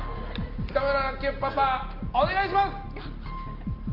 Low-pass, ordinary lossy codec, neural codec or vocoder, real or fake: 5.4 kHz; Opus, 32 kbps; none; real